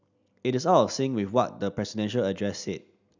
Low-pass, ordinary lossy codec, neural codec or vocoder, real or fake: 7.2 kHz; none; none; real